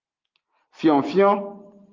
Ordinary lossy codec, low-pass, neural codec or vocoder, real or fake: Opus, 32 kbps; 7.2 kHz; none; real